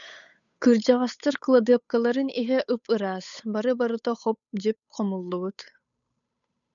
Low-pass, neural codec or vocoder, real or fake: 7.2 kHz; codec, 16 kHz, 8 kbps, FunCodec, trained on Chinese and English, 25 frames a second; fake